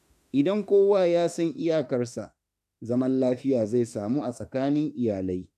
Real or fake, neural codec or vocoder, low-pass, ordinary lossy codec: fake; autoencoder, 48 kHz, 32 numbers a frame, DAC-VAE, trained on Japanese speech; 14.4 kHz; none